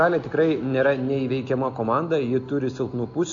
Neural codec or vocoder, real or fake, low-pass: none; real; 7.2 kHz